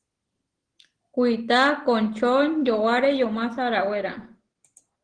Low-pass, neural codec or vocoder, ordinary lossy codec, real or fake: 9.9 kHz; none; Opus, 16 kbps; real